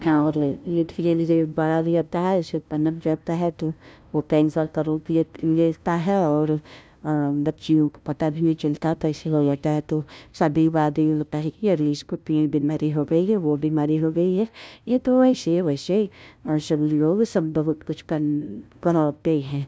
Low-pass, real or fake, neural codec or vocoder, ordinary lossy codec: none; fake; codec, 16 kHz, 0.5 kbps, FunCodec, trained on LibriTTS, 25 frames a second; none